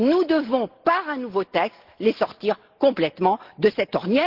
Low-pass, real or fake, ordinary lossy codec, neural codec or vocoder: 5.4 kHz; real; Opus, 16 kbps; none